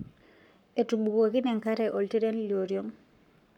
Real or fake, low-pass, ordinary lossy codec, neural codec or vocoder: fake; 19.8 kHz; none; codec, 44.1 kHz, 7.8 kbps, Pupu-Codec